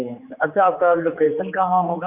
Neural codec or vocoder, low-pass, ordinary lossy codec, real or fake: codec, 16 kHz, 4 kbps, X-Codec, HuBERT features, trained on general audio; 3.6 kHz; AAC, 32 kbps; fake